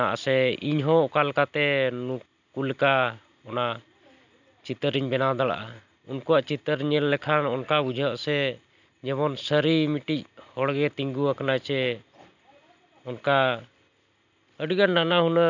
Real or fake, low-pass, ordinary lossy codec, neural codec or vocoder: real; 7.2 kHz; none; none